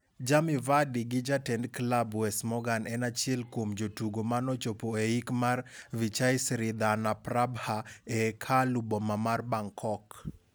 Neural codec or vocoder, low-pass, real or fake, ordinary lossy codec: none; none; real; none